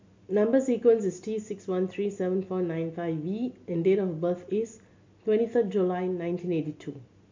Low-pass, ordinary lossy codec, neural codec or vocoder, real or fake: 7.2 kHz; MP3, 48 kbps; none; real